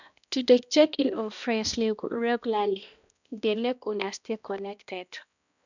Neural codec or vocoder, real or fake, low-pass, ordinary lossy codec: codec, 16 kHz, 1 kbps, X-Codec, HuBERT features, trained on balanced general audio; fake; 7.2 kHz; none